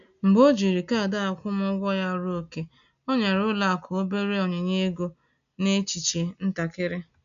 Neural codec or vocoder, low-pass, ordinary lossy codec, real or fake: none; 7.2 kHz; none; real